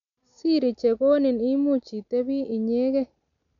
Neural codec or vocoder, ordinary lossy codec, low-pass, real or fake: none; none; 7.2 kHz; real